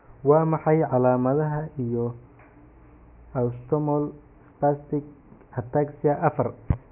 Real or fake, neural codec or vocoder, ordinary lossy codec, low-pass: real; none; none; 3.6 kHz